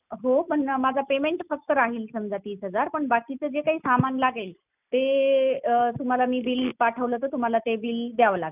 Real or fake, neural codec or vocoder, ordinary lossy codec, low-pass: real; none; none; 3.6 kHz